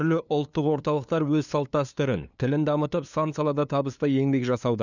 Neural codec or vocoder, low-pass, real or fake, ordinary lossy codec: codec, 16 kHz, 2 kbps, FunCodec, trained on LibriTTS, 25 frames a second; 7.2 kHz; fake; none